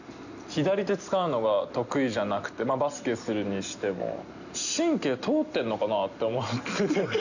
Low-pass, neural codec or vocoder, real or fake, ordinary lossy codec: 7.2 kHz; none; real; none